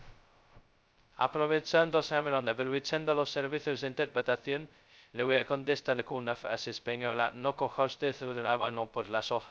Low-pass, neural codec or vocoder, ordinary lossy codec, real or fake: none; codec, 16 kHz, 0.2 kbps, FocalCodec; none; fake